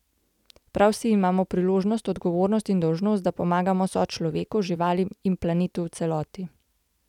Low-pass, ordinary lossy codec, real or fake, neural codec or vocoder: 19.8 kHz; none; real; none